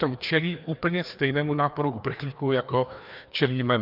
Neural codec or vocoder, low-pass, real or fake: codec, 16 kHz, 2 kbps, FreqCodec, larger model; 5.4 kHz; fake